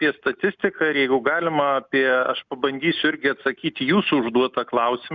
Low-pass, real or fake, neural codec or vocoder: 7.2 kHz; real; none